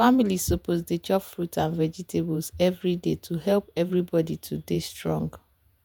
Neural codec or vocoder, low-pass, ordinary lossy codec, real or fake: vocoder, 48 kHz, 128 mel bands, Vocos; none; none; fake